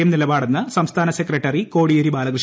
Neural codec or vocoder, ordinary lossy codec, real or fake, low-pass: none; none; real; none